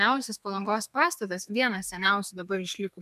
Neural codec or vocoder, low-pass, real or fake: codec, 32 kHz, 1.9 kbps, SNAC; 14.4 kHz; fake